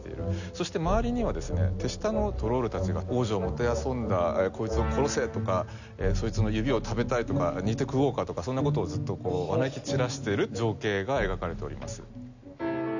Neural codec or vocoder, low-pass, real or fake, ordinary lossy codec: none; 7.2 kHz; real; none